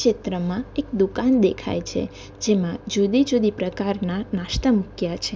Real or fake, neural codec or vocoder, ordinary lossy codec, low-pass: real; none; none; none